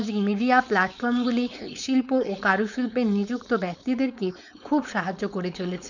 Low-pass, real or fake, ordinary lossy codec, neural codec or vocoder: 7.2 kHz; fake; none; codec, 16 kHz, 4.8 kbps, FACodec